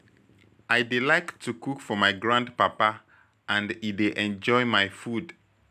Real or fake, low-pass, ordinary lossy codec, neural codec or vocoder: real; 14.4 kHz; none; none